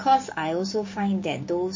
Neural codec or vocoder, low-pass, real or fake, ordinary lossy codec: codec, 16 kHz, 6 kbps, DAC; 7.2 kHz; fake; MP3, 32 kbps